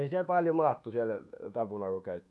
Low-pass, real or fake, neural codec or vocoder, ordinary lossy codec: none; fake; codec, 24 kHz, 1.2 kbps, DualCodec; none